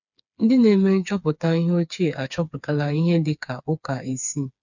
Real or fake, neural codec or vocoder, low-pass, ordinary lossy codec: fake; codec, 16 kHz, 4 kbps, FreqCodec, smaller model; 7.2 kHz; none